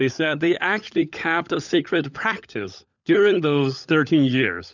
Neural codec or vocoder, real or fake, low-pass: codec, 16 kHz, 16 kbps, FunCodec, trained on LibriTTS, 50 frames a second; fake; 7.2 kHz